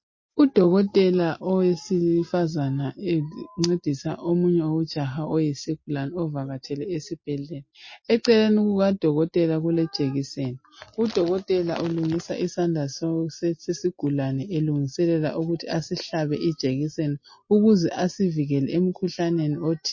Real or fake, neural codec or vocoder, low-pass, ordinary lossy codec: real; none; 7.2 kHz; MP3, 32 kbps